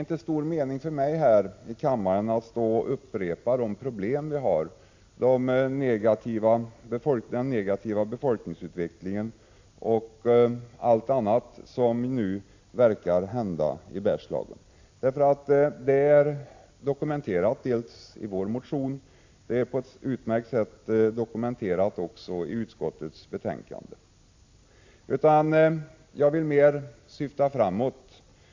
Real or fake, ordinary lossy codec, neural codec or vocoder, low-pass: real; AAC, 48 kbps; none; 7.2 kHz